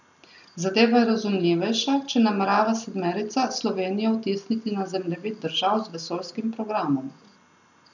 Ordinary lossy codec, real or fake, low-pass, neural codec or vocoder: none; real; 7.2 kHz; none